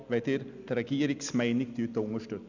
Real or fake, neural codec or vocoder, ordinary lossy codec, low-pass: real; none; none; 7.2 kHz